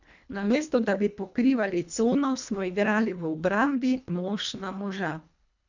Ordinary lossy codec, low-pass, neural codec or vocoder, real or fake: none; 7.2 kHz; codec, 24 kHz, 1.5 kbps, HILCodec; fake